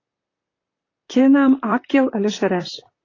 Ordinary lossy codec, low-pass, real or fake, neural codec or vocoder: AAC, 32 kbps; 7.2 kHz; fake; vocoder, 44.1 kHz, 128 mel bands, Pupu-Vocoder